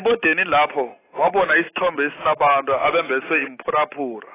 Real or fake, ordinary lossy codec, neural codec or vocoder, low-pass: real; AAC, 16 kbps; none; 3.6 kHz